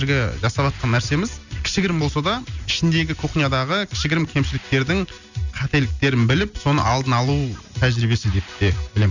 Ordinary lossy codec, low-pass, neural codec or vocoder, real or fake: none; 7.2 kHz; none; real